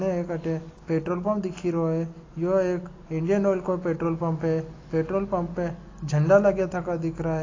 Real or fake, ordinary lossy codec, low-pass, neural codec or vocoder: real; AAC, 32 kbps; 7.2 kHz; none